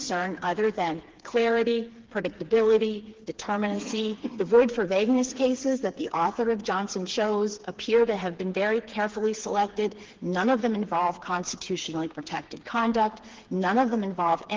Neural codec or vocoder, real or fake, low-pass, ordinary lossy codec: codec, 16 kHz, 4 kbps, FreqCodec, smaller model; fake; 7.2 kHz; Opus, 16 kbps